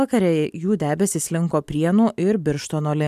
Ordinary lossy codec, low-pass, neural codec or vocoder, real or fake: MP3, 96 kbps; 14.4 kHz; none; real